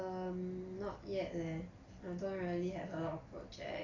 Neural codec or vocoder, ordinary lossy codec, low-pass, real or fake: none; AAC, 32 kbps; 7.2 kHz; real